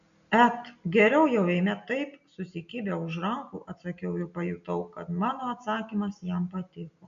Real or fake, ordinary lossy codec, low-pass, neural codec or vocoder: real; MP3, 96 kbps; 7.2 kHz; none